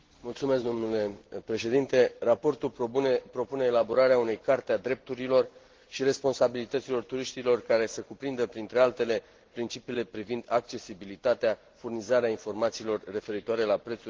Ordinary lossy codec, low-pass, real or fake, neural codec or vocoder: Opus, 16 kbps; 7.2 kHz; real; none